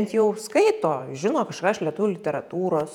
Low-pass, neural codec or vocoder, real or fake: 19.8 kHz; vocoder, 48 kHz, 128 mel bands, Vocos; fake